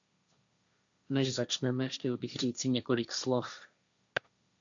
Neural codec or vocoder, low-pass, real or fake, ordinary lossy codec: codec, 16 kHz, 1.1 kbps, Voila-Tokenizer; 7.2 kHz; fake; MP3, 64 kbps